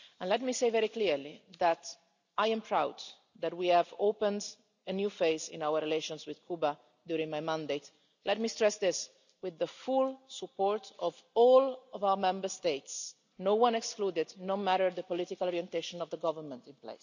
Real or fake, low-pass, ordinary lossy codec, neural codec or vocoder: real; 7.2 kHz; none; none